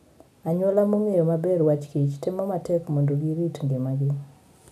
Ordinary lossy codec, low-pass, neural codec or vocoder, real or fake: none; 14.4 kHz; none; real